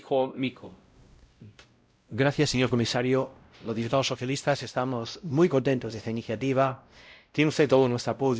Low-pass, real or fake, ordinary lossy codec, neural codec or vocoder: none; fake; none; codec, 16 kHz, 0.5 kbps, X-Codec, WavLM features, trained on Multilingual LibriSpeech